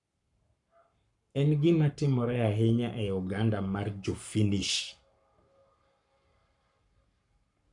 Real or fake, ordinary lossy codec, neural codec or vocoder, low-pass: fake; none; codec, 44.1 kHz, 7.8 kbps, Pupu-Codec; 10.8 kHz